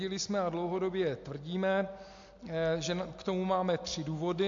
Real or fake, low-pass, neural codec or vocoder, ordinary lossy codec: real; 7.2 kHz; none; MP3, 48 kbps